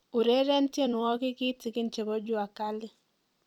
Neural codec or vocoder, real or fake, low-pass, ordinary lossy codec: vocoder, 44.1 kHz, 128 mel bands every 256 samples, BigVGAN v2; fake; 19.8 kHz; none